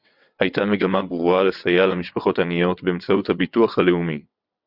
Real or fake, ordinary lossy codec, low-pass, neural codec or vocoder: fake; Opus, 64 kbps; 5.4 kHz; vocoder, 22.05 kHz, 80 mel bands, WaveNeXt